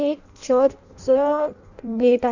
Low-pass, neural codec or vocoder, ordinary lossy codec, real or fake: 7.2 kHz; codec, 16 kHz in and 24 kHz out, 0.6 kbps, FireRedTTS-2 codec; none; fake